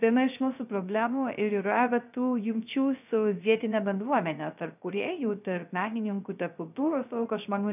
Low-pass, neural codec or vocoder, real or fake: 3.6 kHz; codec, 16 kHz, 0.3 kbps, FocalCodec; fake